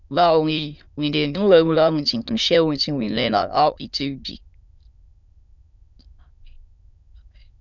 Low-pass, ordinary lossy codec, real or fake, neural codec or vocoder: 7.2 kHz; none; fake; autoencoder, 22.05 kHz, a latent of 192 numbers a frame, VITS, trained on many speakers